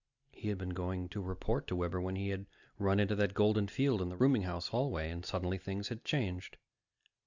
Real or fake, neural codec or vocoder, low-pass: real; none; 7.2 kHz